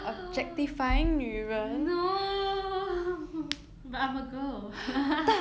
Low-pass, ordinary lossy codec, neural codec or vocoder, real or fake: none; none; none; real